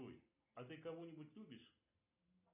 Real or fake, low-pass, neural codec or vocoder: real; 3.6 kHz; none